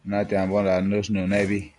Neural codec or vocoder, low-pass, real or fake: none; 10.8 kHz; real